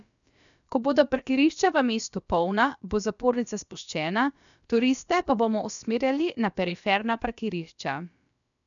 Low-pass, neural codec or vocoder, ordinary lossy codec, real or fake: 7.2 kHz; codec, 16 kHz, about 1 kbps, DyCAST, with the encoder's durations; none; fake